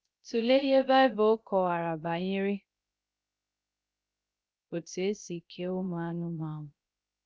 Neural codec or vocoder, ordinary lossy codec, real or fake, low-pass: codec, 16 kHz, 0.3 kbps, FocalCodec; none; fake; none